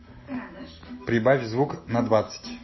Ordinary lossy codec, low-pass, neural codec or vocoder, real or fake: MP3, 24 kbps; 7.2 kHz; none; real